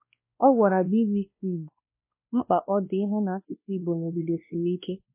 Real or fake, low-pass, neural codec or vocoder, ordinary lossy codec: fake; 3.6 kHz; codec, 16 kHz, 1 kbps, X-Codec, WavLM features, trained on Multilingual LibriSpeech; MP3, 24 kbps